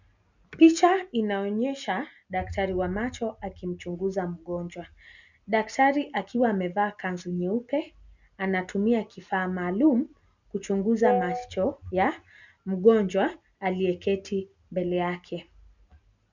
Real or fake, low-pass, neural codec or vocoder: real; 7.2 kHz; none